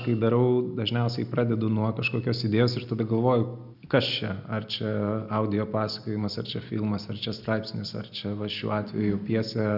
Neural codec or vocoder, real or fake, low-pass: none; real; 5.4 kHz